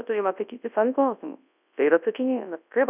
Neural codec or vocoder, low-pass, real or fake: codec, 24 kHz, 0.9 kbps, WavTokenizer, large speech release; 3.6 kHz; fake